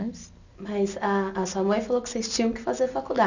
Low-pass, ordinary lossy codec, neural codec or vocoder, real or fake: 7.2 kHz; none; none; real